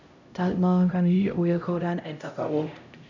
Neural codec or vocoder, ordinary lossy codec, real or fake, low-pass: codec, 16 kHz, 0.5 kbps, X-Codec, HuBERT features, trained on LibriSpeech; none; fake; 7.2 kHz